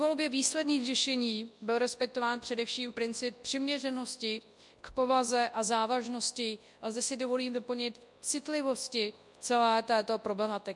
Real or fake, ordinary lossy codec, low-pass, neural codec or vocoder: fake; MP3, 64 kbps; 10.8 kHz; codec, 24 kHz, 0.9 kbps, WavTokenizer, large speech release